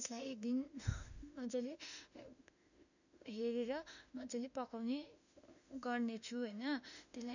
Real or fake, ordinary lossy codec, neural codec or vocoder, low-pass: fake; none; autoencoder, 48 kHz, 32 numbers a frame, DAC-VAE, trained on Japanese speech; 7.2 kHz